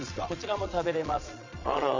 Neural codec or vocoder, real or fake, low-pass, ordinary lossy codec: vocoder, 22.05 kHz, 80 mel bands, Vocos; fake; 7.2 kHz; MP3, 64 kbps